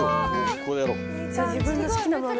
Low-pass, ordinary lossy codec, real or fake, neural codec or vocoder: none; none; real; none